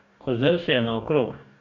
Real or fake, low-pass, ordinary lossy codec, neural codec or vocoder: fake; 7.2 kHz; none; codec, 44.1 kHz, 2.6 kbps, DAC